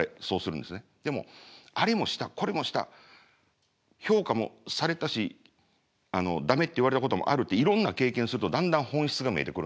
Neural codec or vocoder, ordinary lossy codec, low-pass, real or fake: none; none; none; real